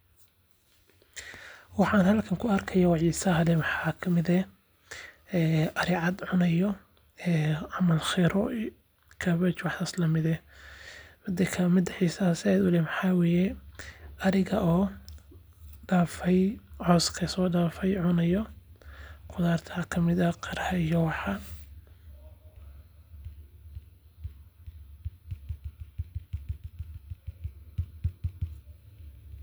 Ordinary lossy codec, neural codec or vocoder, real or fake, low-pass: none; none; real; none